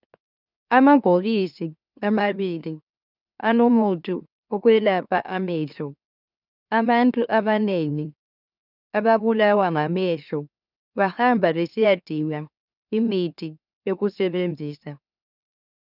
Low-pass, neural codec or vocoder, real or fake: 5.4 kHz; autoencoder, 44.1 kHz, a latent of 192 numbers a frame, MeloTTS; fake